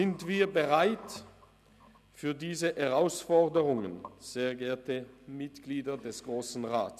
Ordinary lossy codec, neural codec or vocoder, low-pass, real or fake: none; none; 14.4 kHz; real